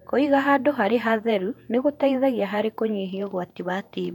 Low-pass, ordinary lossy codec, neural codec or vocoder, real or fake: 19.8 kHz; none; vocoder, 48 kHz, 128 mel bands, Vocos; fake